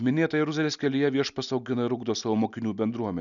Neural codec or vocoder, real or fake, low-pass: none; real; 7.2 kHz